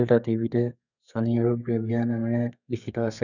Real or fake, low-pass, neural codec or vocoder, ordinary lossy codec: fake; 7.2 kHz; codec, 32 kHz, 1.9 kbps, SNAC; none